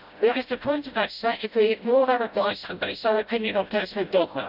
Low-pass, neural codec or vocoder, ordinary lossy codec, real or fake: 5.4 kHz; codec, 16 kHz, 0.5 kbps, FreqCodec, smaller model; none; fake